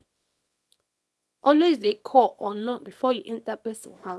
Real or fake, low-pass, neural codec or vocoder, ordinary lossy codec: fake; none; codec, 24 kHz, 0.9 kbps, WavTokenizer, small release; none